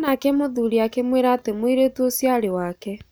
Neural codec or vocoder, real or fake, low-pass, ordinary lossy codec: none; real; none; none